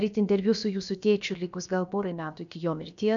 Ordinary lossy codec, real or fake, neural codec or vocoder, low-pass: MP3, 96 kbps; fake; codec, 16 kHz, about 1 kbps, DyCAST, with the encoder's durations; 7.2 kHz